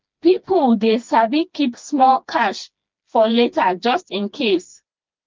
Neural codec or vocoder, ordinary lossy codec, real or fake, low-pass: codec, 16 kHz, 2 kbps, FreqCodec, smaller model; Opus, 24 kbps; fake; 7.2 kHz